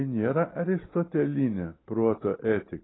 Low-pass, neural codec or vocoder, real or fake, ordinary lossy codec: 7.2 kHz; none; real; AAC, 16 kbps